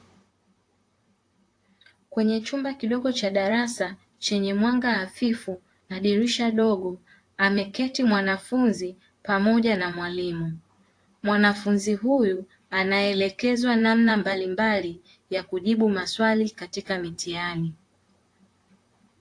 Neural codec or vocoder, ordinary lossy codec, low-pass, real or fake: vocoder, 44.1 kHz, 128 mel bands, Pupu-Vocoder; AAC, 48 kbps; 9.9 kHz; fake